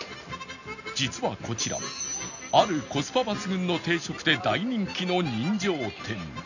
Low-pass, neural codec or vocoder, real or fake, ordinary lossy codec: 7.2 kHz; none; real; none